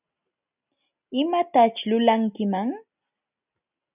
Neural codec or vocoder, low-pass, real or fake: none; 3.6 kHz; real